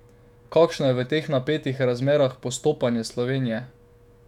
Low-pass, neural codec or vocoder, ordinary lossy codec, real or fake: 19.8 kHz; autoencoder, 48 kHz, 128 numbers a frame, DAC-VAE, trained on Japanese speech; none; fake